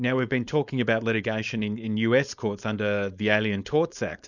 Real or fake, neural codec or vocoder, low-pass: fake; codec, 16 kHz, 4.8 kbps, FACodec; 7.2 kHz